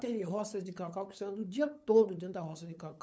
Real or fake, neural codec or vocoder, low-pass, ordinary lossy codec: fake; codec, 16 kHz, 8 kbps, FunCodec, trained on LibriTTS, 25 frames a second; none; none